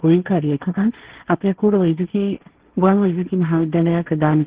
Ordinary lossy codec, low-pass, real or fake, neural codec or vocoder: Opus, 16 kbps; 3.6 kHz; fake; codec, 16 kHz, 1.1 kbps, Voila-Tokenizer